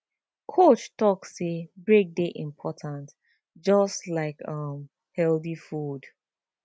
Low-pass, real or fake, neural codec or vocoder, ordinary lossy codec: none; real; none; none